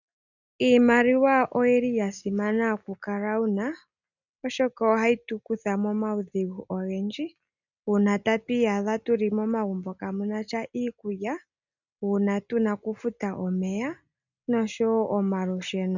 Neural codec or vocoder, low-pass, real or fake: none; 7.2 kHz; real